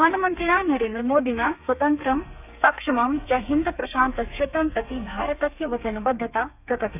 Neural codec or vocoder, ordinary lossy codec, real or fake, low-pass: codec, 32 kHz, 1.9 kbps, SNAC; none; fake; 3.6 kHz